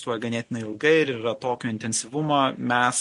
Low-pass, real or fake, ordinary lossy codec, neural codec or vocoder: 14.4 kHz; real; MP3, 48 kbps; none